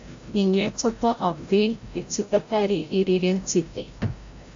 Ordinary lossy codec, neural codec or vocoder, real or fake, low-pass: AAC, 64 kbps; codec, 16 kHz, 0.5 kbps, FreqCodec, larger model; fake; 7.2 kHz